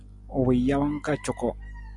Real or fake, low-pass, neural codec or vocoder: real; 10.8 kHz; none